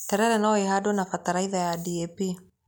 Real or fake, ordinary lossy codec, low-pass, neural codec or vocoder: real; none; none; none